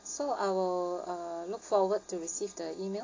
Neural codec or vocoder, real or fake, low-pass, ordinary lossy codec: none; real; 7.2 kHz; AAC, 32 kbps